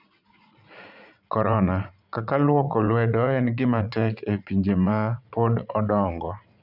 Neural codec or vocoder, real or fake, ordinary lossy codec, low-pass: vocoder, 44.1 kHz, 80 mel bands, Vocos; fake; none; 5.4 kHz